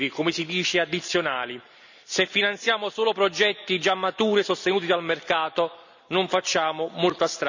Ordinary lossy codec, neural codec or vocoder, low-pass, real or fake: none; none; 7.2 kHz; real